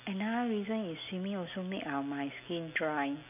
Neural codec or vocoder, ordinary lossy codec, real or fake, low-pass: none; none; real; 3.6 kHz